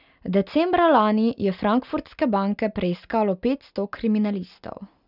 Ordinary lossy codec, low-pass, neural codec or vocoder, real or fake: none; 5.4 kHz; none; real